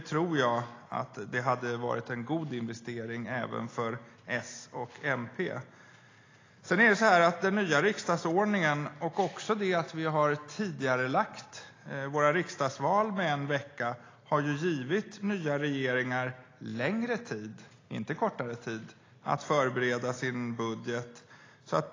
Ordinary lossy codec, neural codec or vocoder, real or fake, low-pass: AAC, 32 kbps; none; real; 7.2 kHz